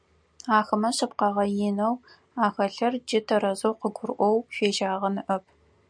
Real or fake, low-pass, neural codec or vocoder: real; 9.9 kHz; none